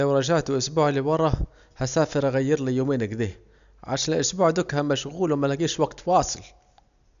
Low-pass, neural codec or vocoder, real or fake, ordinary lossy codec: 7.2 kHz; none; real; none